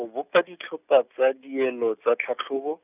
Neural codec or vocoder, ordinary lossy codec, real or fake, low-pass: none; none; real; 3.6 kHz